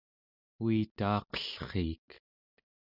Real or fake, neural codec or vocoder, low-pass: real; none; 5.4 kHz